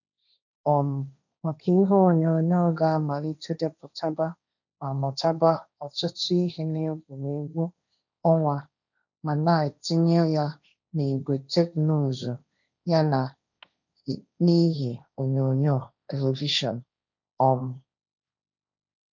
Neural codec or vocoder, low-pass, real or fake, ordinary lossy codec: codec, 16 kHz, 1.1 kbps, Voila-Tokenizer; 7.2 kHz; fake; none